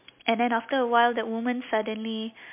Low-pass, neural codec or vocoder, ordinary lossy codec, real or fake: 3.6 kHz; none; MP3, 32 kbps; real